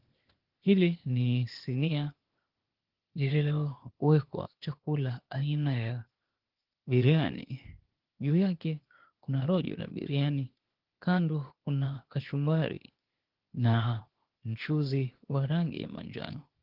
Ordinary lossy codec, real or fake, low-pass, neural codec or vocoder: Opus, 16 kbps; fake; 5.4 kHz; codec, 16 kHz, 0.8 kbps, ZipCodec